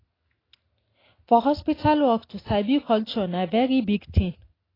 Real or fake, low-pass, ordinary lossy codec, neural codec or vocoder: real; 5.4 kHz; AAC, 24 kbps; none